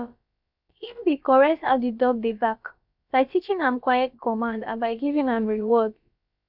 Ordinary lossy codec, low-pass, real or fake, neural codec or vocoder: none; 5.4 kHz; fake; codec, 16 kHz, about 1 kbps, DyCAST, with the encoder's durations